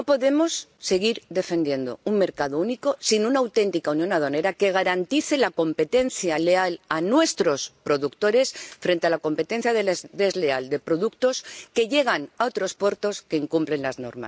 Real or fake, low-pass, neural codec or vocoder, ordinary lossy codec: real; none; none; none